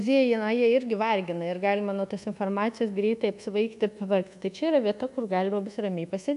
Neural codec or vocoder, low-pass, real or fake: codec, 24 kHz, 1.2 kbps, DualCodec; 10.8 kHz; fake